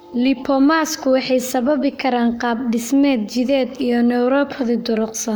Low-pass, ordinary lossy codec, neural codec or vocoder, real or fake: none; none; codec, 44.1 kHz, 7.8 kbps, Pupu-Codec; fake